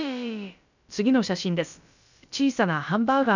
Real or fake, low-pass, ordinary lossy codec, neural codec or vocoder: fake; 7.2 kHz; none; codec, 16 kHz, about 1 kbps, DyCAST, with the encoder's durations